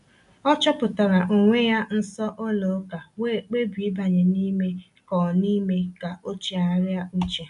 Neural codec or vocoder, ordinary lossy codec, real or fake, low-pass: none; none; real; 10.8 kHz